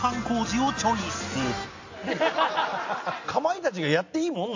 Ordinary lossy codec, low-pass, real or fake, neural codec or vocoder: AAC, 48 kbps; 7.2 kHz; real; none